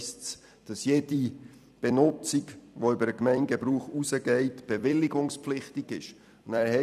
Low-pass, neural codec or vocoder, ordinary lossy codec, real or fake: 14.4 kHz; vocoder, 44.1 kHz, 128 mel bands every 256 samples, BigVGAN v2; none; fake